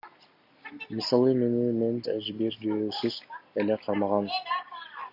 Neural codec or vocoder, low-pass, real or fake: none; 5.4 kHz; real